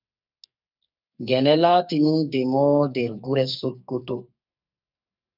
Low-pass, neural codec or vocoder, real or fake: 5.4 kHz; codec, 44.1 kHz, 2.6 kbps, SNAC; fake